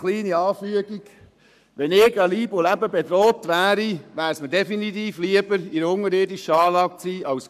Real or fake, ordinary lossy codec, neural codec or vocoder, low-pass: fake; none; codec, 44.1 kHz, 7.8 kbps, Pupu-Codec; 14.4 kHz